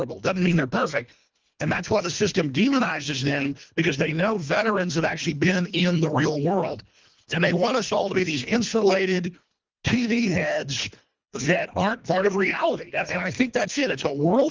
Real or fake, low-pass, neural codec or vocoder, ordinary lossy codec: fake; 7.2 kHz; codec, 24 kHz, 1.5 kbps, HILCodec; Opus, 32 kbps